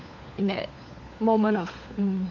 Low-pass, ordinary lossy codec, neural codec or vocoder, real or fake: 7.2 kHz; none; codec, 16 kHz, 4 kbps, FunCodec, trained on LibriTTS, 50 frames a second; fake